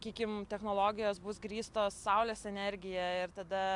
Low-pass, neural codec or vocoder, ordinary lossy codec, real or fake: 10.8 kHz; none; AAC, 64 kbps; real